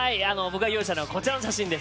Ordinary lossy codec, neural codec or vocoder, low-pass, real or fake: none; none; none; real